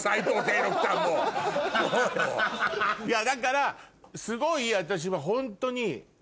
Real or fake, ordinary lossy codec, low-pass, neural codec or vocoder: real; none; none; none